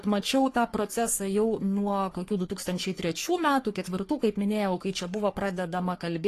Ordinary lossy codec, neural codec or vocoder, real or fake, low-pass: AAC, 48 kbps; codec, 44.1 kHz, 3.4 kbps, Pupu-Codec; fake; 14.4 kHz